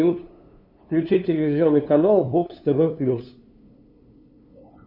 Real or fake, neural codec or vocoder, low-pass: fake; codec, 16 kHz, 2 kbps, FunCodec, trained on LibriTTS, 25 frames a second; 5.4 kHz